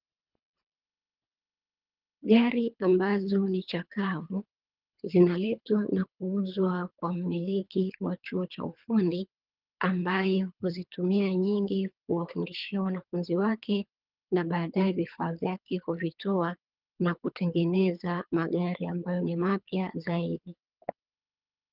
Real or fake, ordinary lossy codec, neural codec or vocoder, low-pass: fake; Opus, 24 kbps; codec, 24 kHz, 3 kbps, HILCodec; 5.4 kHz